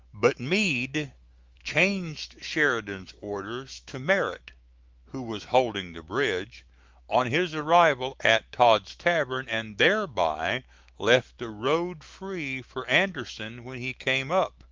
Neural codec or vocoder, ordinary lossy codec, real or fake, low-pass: autoencoder, 48 kHz, 128 numbers a frame, DAC-VAE, trained on Japanese speech; Opus, 32 kbps; fake; 7.2 kHz